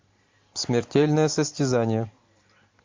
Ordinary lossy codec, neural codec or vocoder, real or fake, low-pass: MP3, 48 kbps; none; real; 7.2 kHz